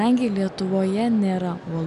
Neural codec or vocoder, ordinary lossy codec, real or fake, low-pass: none; MP3, 96 kbps; real; 10.8 kHz